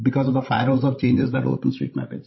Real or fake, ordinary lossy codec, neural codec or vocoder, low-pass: fake; MP3, 24 kbps; codec, 16 kHz, 16 kbps, FreqCodec, larger model; 7.2 kHz